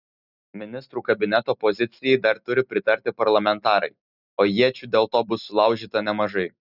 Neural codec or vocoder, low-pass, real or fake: none; 5.4 kHz; real